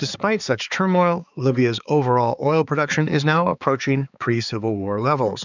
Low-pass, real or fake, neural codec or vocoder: 7.2 kHz; fake; codec, 16 kHz, 4 kbps, X-Codec, HuBERT features, trained on balanced general audio